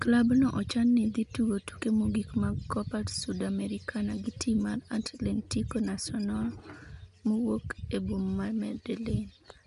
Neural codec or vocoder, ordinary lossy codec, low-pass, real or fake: none; none; 10.8 kHz; real